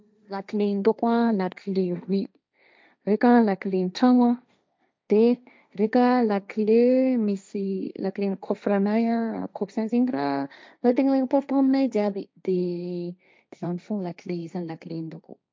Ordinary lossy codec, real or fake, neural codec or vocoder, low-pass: none; fake; codec, 16 kHz, 1.1 kbps, Voila-Tokenizer; none